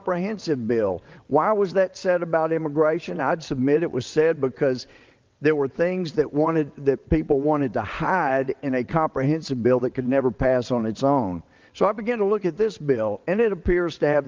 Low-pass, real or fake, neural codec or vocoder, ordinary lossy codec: 7.2 kHz; fake; vocoder, 22.05 kHz, 80 mel bands, WaveNeXt; Opus, 24 kbps